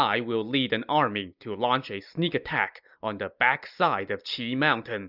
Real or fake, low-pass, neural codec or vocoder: real; 5.4 kHz; none